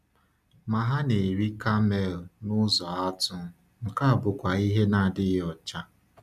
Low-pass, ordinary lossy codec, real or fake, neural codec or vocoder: 14.4 kHz; none; real; none